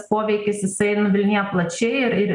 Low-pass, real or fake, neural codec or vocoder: 10.8 kHz; real; none